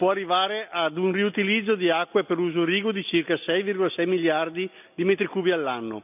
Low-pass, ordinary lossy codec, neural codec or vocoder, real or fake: 3.6 kHz; none; none; real